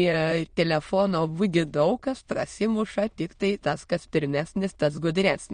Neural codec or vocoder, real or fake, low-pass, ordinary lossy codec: autoencoder, 22.05 kHz, a latent of 192 numbers a frame, VITS, trained on many speakers; fake; 9.9 kHz; MP3, 48 kbps